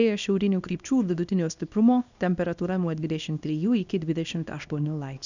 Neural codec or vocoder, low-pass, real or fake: codec, 24 kHz, 0.9 kbps, WavTokenizer, small release; 7.2 kHz; fake